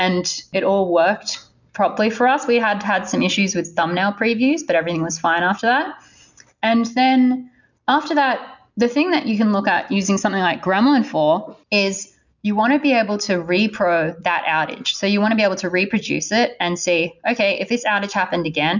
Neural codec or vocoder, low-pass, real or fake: none; 7.2 kHz; real